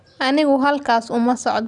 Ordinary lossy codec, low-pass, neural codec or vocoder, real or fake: none; 10.8 kHz; none; real